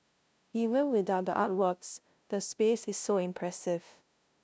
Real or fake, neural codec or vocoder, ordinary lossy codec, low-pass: fake; codec, 16 kHz, 0.5 kbps, FunCodec, trained on LibriTTS, 25 frames a second; none; none